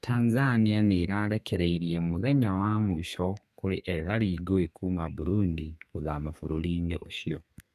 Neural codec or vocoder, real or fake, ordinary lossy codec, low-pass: codec, 32 kHz, 1.9 kbps, SNAC; fake; none; 14.4 kHz